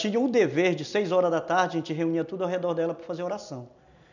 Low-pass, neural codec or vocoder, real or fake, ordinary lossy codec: 7.2 kHz; none; real; none